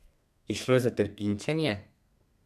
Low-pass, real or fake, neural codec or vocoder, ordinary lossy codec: 14.4 kHz; fake; codec, 32 kHz, 1.9 kbps, SNAC; none